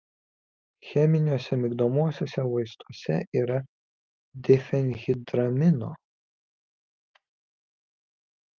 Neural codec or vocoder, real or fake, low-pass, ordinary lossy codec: none; real; 7.2 kHz; Opus, 24 kbps